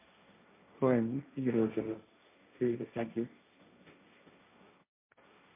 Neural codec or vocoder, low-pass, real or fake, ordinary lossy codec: codec, 16 kHz, 1.1 kbps, Voila-Tokenizer; 3.6 kHz; fake; MP3, 32 kbps